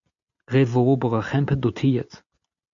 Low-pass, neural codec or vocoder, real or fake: 7.2 kHz; none; real